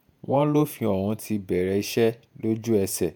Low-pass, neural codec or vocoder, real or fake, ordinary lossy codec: none; vocoder, 48 kHz, 128 mel bands, Vocos; fake; none